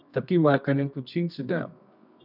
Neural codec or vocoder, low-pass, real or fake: codec, 24 kHz, 0.9 kbps, WavTokenizer, medium music audio release; 5.4 kHz; fake